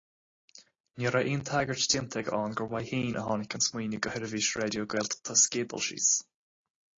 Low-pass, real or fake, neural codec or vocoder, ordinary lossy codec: 7.2 kHz; real; none; AAC, 32 kbps